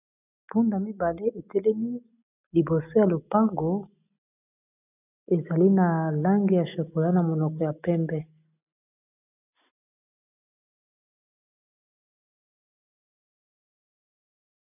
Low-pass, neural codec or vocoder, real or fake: 3.6 kHz; none; real